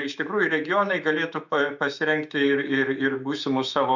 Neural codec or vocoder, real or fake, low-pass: autoencoder, 48 kHz, 128 numbers a frame, DAC-VAE, trained on Japanese speech; fake; 7.2 kHz